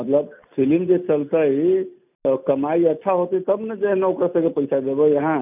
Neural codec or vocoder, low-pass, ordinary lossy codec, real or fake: none; 3.6 kHz; none; real